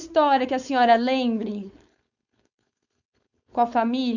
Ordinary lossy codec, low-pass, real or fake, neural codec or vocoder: none; 7.2 kHz; fake; codec, 16 kHz, 4.8 kbps, FACodec